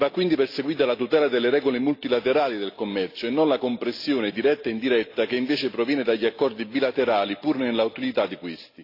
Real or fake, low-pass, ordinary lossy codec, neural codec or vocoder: real; 5.4 kHz; AAC, 32 kbps; none